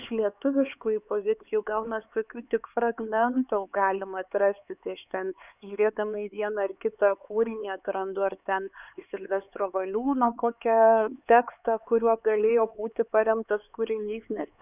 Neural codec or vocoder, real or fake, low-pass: codec, 16 kHz, 4 kbps, X-Codec, HuBERT features, trained on LibriSpeech; fake; 3.6 kHz